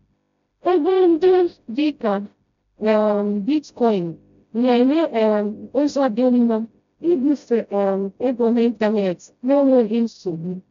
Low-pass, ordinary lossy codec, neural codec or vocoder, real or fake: 7.2 kHz; MP3, 64 kbps; codec, 16 kHz, 0.5 kbps, FreqCodec, smaller model; fake